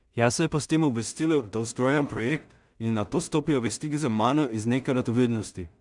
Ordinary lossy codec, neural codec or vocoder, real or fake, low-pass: none; codec, 16 kHz in and 24 kHz out, 0.4 kbps, LongCat-Audio-Codec, two codebook decoder; fake; 10.8 kHz